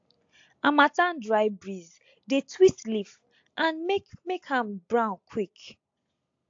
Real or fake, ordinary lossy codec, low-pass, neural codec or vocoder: real; AAC, 48 kbps; 7.2 kHz; none